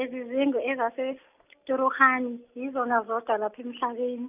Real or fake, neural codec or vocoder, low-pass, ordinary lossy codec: real; none; 3.6 kHz; none